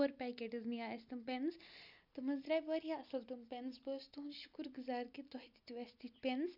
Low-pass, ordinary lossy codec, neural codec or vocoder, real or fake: 5.4 kHz; MP3, 48 kbps; none; real